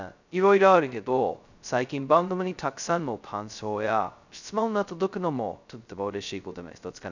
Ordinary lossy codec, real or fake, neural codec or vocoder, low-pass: none; fake; codec, 16 kHz, 0.2 kbps, FocalCodec; 7.2 kHz